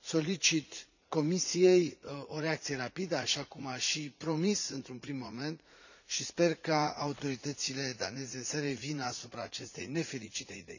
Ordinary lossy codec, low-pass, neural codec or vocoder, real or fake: none; 7.2 kHz; vocoder, 44.1 kHz, 80 mel bands, Vocos; fake